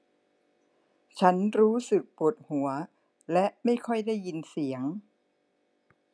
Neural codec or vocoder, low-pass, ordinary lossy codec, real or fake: none; none; none; real